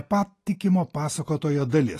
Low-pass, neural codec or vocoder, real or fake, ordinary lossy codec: 14.4 kHz; none; real; AAC, 48 kbps